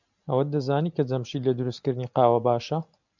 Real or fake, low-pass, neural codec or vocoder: real; 7.2 kHz; none